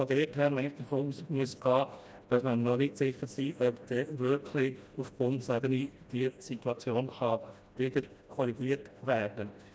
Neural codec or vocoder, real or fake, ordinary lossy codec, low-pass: codec, 16 kHz, 1 kbps, FreqCodec, smaller model; fake; none; none